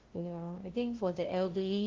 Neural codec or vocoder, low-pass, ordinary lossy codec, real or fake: codec, 16 kHz, 0.5 kbps, FunCodec, trained on LibriTTS, 25 frames a second; 7.2 kHz; Opus, 16 kbps; fake